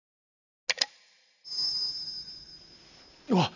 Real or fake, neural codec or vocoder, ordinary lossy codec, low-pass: real; none; none; 7.2 kHz